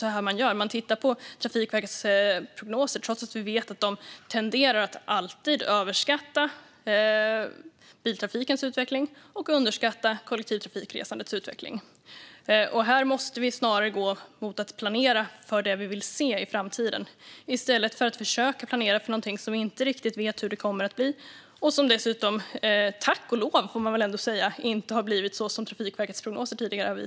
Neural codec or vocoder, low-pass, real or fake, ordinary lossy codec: none; none; real; none